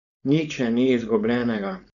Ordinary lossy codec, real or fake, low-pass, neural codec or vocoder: none; fake; 7.2 kHz; codec, 16 kHz, 4.8 kbps, FACodec